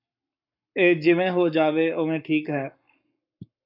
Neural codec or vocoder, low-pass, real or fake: codec, 44.1 kHz, 7.8 kbps, Pupu-Codec; 5.4 kHz; fake